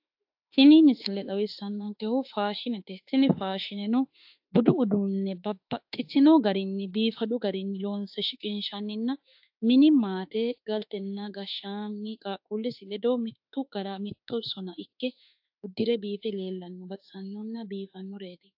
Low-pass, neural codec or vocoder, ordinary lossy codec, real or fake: 5.4 kHz; autoencoder, 48 kHz, 32 numbers a frame, DAC-VAE, trained on Japanese speech; AAC, 48 kbps; fake